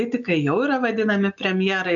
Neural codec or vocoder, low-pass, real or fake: none; 7.2 kHz; real